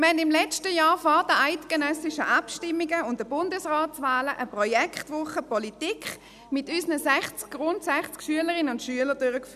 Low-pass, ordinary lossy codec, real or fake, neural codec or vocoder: 14.4 kHz; none; real; none